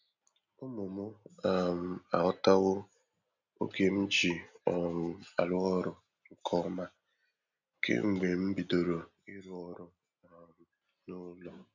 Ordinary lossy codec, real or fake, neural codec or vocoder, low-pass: none; real; none; 7.2 kHz